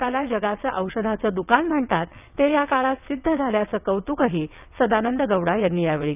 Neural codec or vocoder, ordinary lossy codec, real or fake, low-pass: vocoder, 22.05 kHz, 80 mel bands, WaveNeXt; none; fake; 3.6 kHz